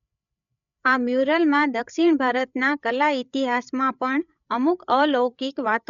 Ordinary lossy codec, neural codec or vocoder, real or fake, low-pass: none; codec, 16 kHz, 8 kbps, FreqCodec, larger model; fake; 7.2 kHz